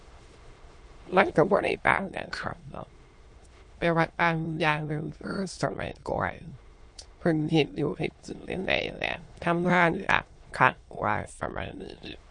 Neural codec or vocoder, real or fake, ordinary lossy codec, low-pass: autoencoder, 22.05 kHz, a latent of 192 numbers a frame, VITS, trained on many speakers; fake; MP3, 48 kbps; 9.9 kHz